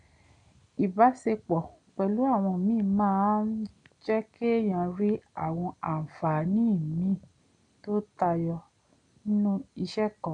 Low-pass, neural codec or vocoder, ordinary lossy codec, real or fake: 9.9 kHz; none; none; real